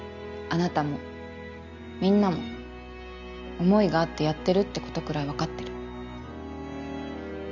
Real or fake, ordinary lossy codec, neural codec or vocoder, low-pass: real; none; none; 7.2 kHz